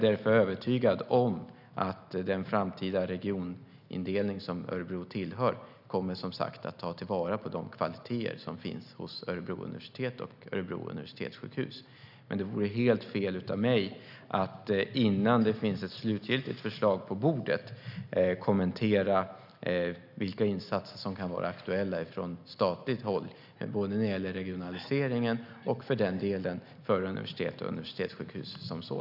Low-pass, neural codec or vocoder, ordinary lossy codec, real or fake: 5.4 kHz; none; none; real